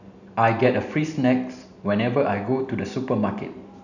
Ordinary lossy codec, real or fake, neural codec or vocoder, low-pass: none; real; none; 7.2 kHz